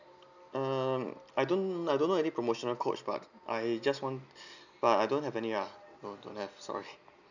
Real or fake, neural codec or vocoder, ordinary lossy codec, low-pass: real; none; none; 7.2 kHz